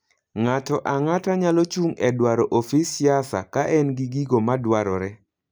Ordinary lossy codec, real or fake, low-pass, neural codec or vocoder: none; real; none; none